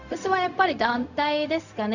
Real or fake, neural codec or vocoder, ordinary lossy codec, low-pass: fake; codec, 16 kHz, 0.4 kbps, LongCat-Audio-Codec; none; 7.2 kHz